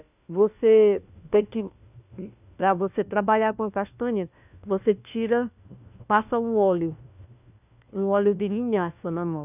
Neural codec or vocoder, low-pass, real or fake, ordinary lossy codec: codec, 16 kHz, 1 kbps, FunCodec, trained on LibriTTS, 50 frames a second; 3.6 kHz; fake; none